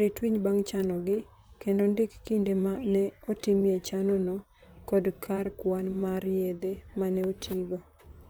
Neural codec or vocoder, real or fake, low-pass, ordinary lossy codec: vocoder, 44.1 kHz, 128 mel bands, Pupu-Vocoder; fake; none; none